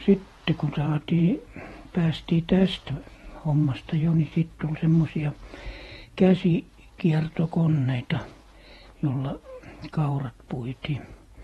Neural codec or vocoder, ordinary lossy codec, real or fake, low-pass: vocoder, 48 kHz, 128 mel bands, Vocos; AAC, 32 kbps; fake; 19.8 kHz